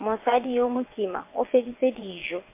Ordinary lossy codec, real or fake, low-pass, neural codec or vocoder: MP3, 24 kbps; fake; 3.6 kHz; vocoder, 22.05 kHz, 80 mel bands, WaveNeXt